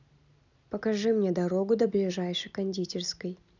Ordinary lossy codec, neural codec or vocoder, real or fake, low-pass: none; none; real; 7.2 kHz